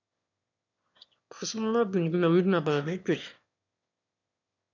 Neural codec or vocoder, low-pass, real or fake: autoencoder, 22.05 kHz, a latent of 192 numbers a frame, VITS, trained on one speaker; 7.2 kHz; fake